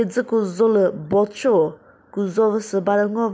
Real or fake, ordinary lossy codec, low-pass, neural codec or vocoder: real; none; none; none